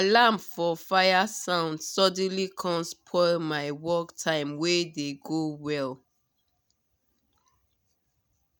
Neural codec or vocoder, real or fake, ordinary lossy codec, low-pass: none; real; none; none